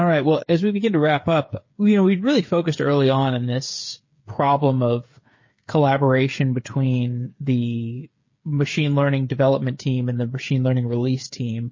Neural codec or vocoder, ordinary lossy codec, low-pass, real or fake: codec, 16 kHz, 8 kbps, FreqCodec, smaller model; MP3, 32 kbps; 7.2 kHz; fake